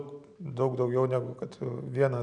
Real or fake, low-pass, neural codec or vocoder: real; 9.9 kHz; none